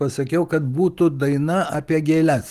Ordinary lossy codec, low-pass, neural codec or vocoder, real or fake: Opus, 24 kbps; 14.4 kHz; none; real